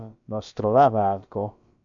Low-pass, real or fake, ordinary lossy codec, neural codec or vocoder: 7.2 kHz; fake; AAC, 64 kbps; codec, 16 kHz, about 1 kbps, DyCAST, with the encoder's durations